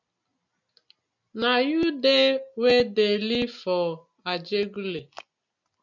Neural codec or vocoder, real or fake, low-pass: none; real; 7.2 kHz